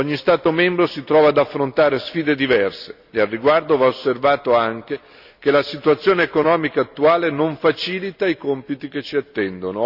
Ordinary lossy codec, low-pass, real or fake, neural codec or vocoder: none; 5.4 kHz; real; none